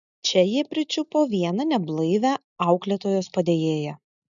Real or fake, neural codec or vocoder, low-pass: real; none; 7.2 kHz